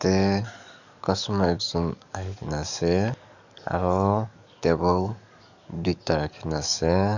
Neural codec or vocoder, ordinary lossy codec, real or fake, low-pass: codec, 44.1 kHz, 7.8 kbps, DAC; none; fake; 7.2 kHz